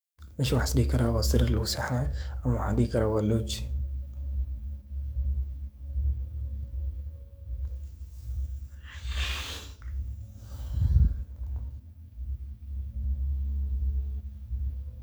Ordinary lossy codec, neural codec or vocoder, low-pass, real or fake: none; codec, 44.1 kHz, 7.8 kbps, DAC; none; fake